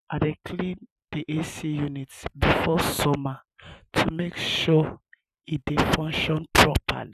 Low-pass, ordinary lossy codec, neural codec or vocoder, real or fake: 14.4 kHz; none; vocoder, 44.1 kHz, 128 mel bands every 512 samples, BigVGAN v2; fake